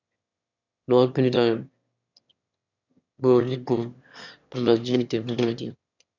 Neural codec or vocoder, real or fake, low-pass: autoencoder, 22.05 kHz, a latent of 192 numbers a frame, VITS, trained on one speaker; fake; 7.2 kHz